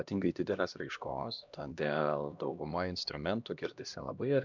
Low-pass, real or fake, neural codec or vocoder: 7.2 kHz; fake; codec, 16 kHz, 1 kbps, X-Codec, HuBERT features, trained on LibriSpeech